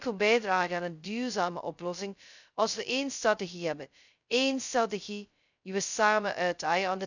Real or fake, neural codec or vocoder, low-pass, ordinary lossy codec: fake; codec, 16 kHz, 0.2 kbps, FocalCodec; 7.2 kHz; none